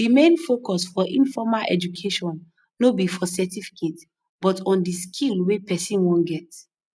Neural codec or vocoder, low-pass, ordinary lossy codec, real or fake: none; none; none; real